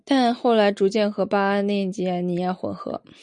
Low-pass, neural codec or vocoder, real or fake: 10.8 kHz; none; real